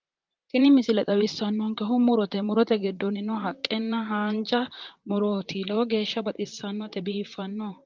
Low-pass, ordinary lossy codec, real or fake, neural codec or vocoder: 7.2 kHz; Opus, 24 kbps; fake; vocoder, 44.1 kHz, 128 mel bands, Pupu-Vocoder